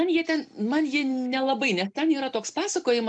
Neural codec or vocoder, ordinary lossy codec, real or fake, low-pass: none; MP3, 64 kbps; real; 9.9 kHz